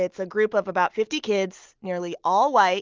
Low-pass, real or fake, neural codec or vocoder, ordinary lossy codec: 7.2 kHz; real; none; Opus, 32 kbps